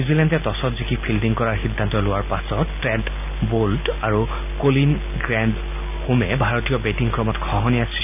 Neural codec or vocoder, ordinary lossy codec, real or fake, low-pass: none; none; real; 3.6 kHz